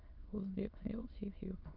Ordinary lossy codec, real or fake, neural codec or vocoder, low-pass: Opus, 24 kbps; fake; autoencoder, 22.05 kHz, a latent of 192 numbers a frame, VITS, trained on many speakers; 5.4 kHz